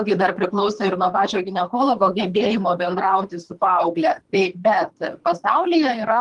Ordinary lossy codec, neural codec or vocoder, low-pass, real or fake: Opus, 24 kbps; codec, 24 kHz, 3 kbps, HILCodec; 10.8 kHz; fake